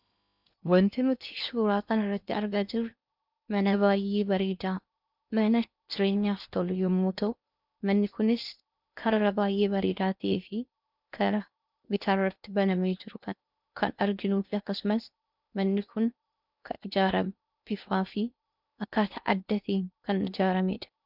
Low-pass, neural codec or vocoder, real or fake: 5.4 kHz; codec, 16 kHz in and 24 kHz out, 0.8 kbps, FocalCodec, streaming, 65536 codes; fake